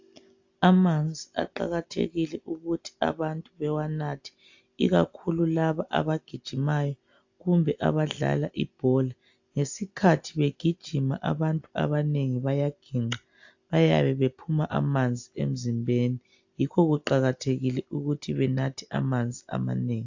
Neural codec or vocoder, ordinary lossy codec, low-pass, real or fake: none; AAC, 48 kbps; 7.2 kHz; real